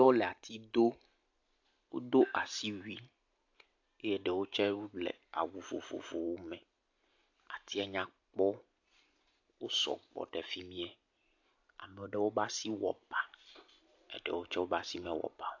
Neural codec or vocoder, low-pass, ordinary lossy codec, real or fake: none; 7.2 kHz; AAC, 48 kbps; real